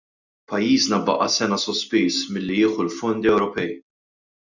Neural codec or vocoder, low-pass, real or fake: none; 7.2 kHz; real